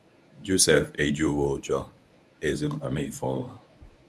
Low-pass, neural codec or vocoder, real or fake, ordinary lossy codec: none; codec, 24 kHz, 0.9 kbps, WavTokenizer, medium speech release version 1; fake; none